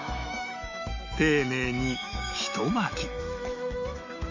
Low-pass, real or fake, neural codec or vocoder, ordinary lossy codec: 7.2 kHz; fake; autoencoder, 48 kHz, 128 numbers a frame, DAC-VAE, trained on Japanese speech; none